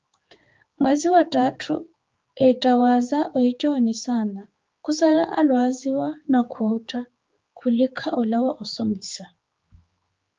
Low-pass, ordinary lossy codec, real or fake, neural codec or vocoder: 7.2 kHz; Opus, 32 kbps; fake; codec, 16 kHz, 4 kbps, X-Codec, HuBERT features, trained on general audio